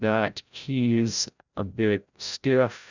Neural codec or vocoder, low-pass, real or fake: codec, 16 kHz, 0.5 kbps, FreqCodec, larger model; 7.2 kHz; fake